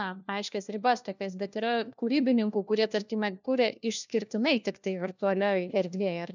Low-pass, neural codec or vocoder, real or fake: 7.2 kHz; codec, 16 kHz, 1 kbps, FunCodec, trained on LibriTTS, 50 frames a second; fake